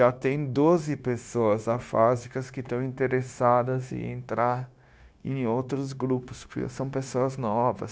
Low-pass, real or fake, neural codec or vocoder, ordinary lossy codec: none; fake; codec, 16 kHz, 0.9 kbps, LongCat-Audio-Codec; none